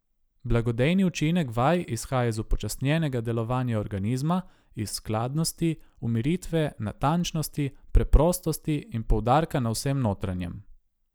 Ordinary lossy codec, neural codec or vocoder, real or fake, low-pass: none; none; real; none